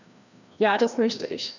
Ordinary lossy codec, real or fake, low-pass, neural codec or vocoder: none; fake; 7.2 kHz; codec, 16 kHz, 1 kbps, FreqCodec, larger model